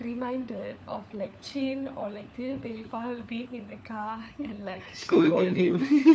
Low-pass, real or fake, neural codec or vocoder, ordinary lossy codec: none; fake; codec, 16 kHz, 4 kbps, FunCodec, trained on LibriTTS, 50 frames a second; none